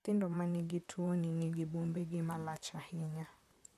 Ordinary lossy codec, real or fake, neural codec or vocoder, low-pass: none; fake; vocoder, 44.1 kHz, 128 mel bands, Pupu-Vocoder; 14.4 kHz